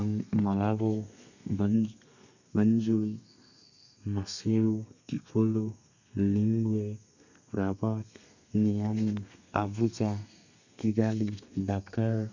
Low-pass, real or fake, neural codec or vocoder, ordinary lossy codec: 7.2 kHz; fake; codec, 32 kHz, 1.9 kbps, SNAC; none